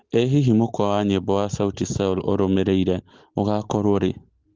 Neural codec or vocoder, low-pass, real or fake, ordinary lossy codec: none; 7.2 kHz; real; Opus, 16 kbps